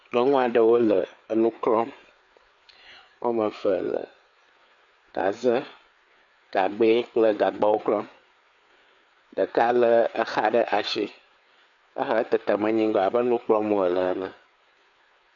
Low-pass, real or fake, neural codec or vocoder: 7.2 kHz; fake; codec, 16 kHz, 4 kbps, FreqCodec, larger model